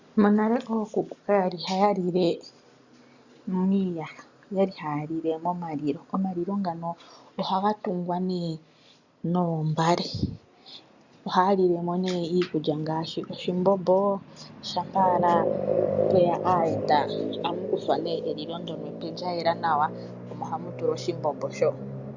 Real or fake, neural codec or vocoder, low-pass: real; none; 7.2 kHz